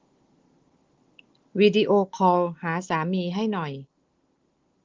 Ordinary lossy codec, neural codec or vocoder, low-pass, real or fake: Opus, 16 kbps; none; 7.2 kHz; real